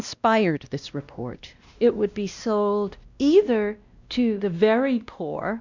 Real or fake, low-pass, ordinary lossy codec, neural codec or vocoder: fake; 7.2 kHz; Opus, 64 kbps; codec, 16 kHz, 1 kbps, X-Codec, WavLM features, trained on Multilingual LibriSpeech